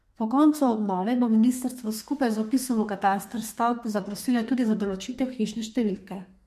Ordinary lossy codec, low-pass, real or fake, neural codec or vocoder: MP3, 64 kbps; 14.4 kHz; fake; codec, 32 kHz, 1.9 kbps, SNAC